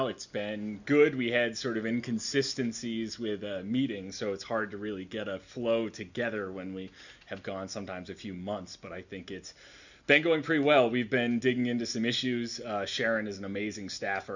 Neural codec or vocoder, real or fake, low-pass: none; real; 7.2 kHz